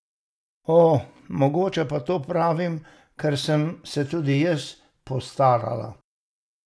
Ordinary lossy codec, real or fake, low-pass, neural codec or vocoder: none; real; none; none